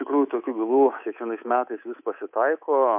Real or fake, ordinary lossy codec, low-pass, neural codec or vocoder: fake; MP3, 32 kbps; 3.6 kHz; codec, 24 kHz, 3.1 kbps, DualCodec